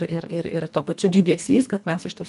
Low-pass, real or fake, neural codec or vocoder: 10.8 kHz; fake; codec, 24 kHz, 1.5 kbps, HILCodec